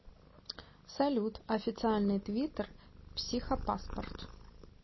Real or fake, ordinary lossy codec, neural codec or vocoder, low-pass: real; MP3, 24 kbps; none; 7.2 kHz